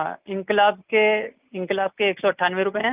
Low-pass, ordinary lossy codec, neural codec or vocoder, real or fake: 3.6 kHz; Opus, 24 kbps; none; real